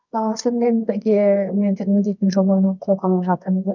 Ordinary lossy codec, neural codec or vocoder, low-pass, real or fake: none; codec, 24 kHz, 0.9 kbps, WavTokenizer, medium music audio release; 7.2 kHz; fake